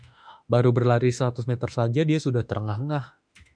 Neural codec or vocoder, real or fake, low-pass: autoencoder, 48 kHz, 32 numbers a frame, DAC-VAE, trained on Japanese speech; fake; 9.9 kHz